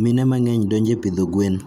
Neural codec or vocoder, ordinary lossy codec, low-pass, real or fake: none; none; 19.8 kHz; real